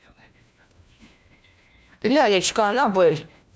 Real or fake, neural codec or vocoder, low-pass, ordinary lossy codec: fake; codec, 16 kHz, 1 kbps, FunCodec, trained on LibriTTS, 50 frames a second; none; none